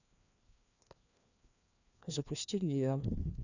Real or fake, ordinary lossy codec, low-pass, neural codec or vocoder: fake; none; 7.2 kHz; codec, 16 kHz, 2 kbps, FreqCodec, larger model